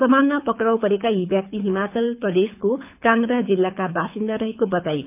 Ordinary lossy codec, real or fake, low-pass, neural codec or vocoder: none; fake; 3.6 kHz; codec, 24 kHz, 6 kbps, HILCodec